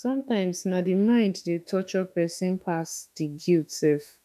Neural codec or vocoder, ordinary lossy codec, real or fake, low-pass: autoencoder, 48 kHz, 32 numbers a frame, DAC-VAE, trained on Japanese speech; none; fake; 14.4 kHz